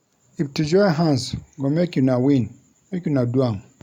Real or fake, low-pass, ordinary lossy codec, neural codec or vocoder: real; 19.8 kHz; none; none